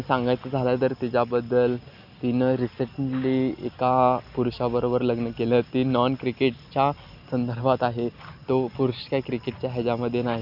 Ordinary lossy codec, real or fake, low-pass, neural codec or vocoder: none; real; 5.4 kHz; none